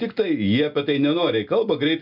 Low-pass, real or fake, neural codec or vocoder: 5.4 kHz; real; none